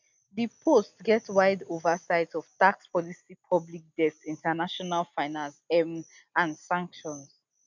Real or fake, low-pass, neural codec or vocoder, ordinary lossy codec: real; 7.2 kHz; none; none